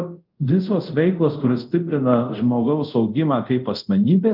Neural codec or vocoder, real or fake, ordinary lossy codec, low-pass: codec, 24 kHz, 0.5 kbps, DualCodec; fake; Opus, 24 kbps; 5.4 kHz